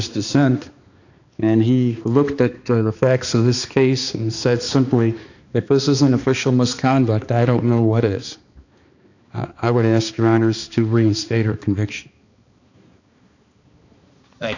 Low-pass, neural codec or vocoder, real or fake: 7.2 kHz; codec, 16 kHz, 2 kbps, X-Codec, HuBERT features, trained on balanced general audio; fake